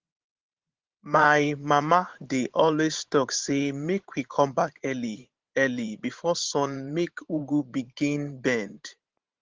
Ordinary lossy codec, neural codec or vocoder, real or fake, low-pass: Opus, 16 kbps; vocoder, 44.1 kHz, 128 mel bands every 512 samples, BigVGAN v2; fake; 7.2 kHz